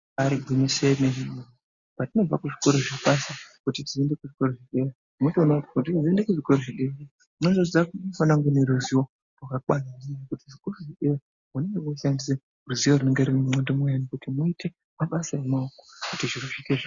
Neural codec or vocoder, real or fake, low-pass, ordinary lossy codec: none; real; 7.2 kHz; Opus, 64 kbps